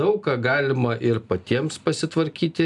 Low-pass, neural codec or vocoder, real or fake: 10.8 kHz; none; real